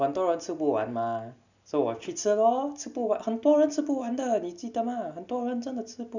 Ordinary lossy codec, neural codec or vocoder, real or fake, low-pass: none; none; real; 7.2 kHz